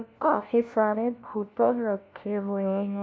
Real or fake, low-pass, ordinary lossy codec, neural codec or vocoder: fake; none; none; codec, 16 kHz, 1 kbps, FunCodec, trained on LibriTTS, 50 frames a second